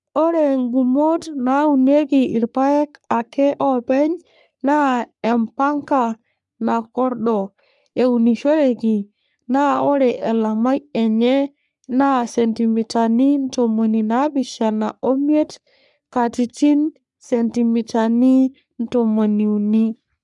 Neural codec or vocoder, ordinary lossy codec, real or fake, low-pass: codec, 44.1 kHz, 3.4 kbps, Pupu-Codec; none; fake; 10.8 kHz